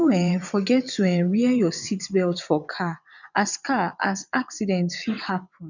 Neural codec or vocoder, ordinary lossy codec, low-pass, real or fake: vocoder, 22.05 kHz, 80 mel bands, WaveNeXt; none; 7.2 kHz; fake